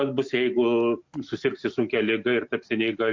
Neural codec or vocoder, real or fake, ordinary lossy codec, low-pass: none; real; MP3, 48 kbps; 7.2 kHz